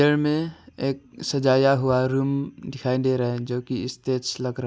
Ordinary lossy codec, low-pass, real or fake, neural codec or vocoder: none; none; real; none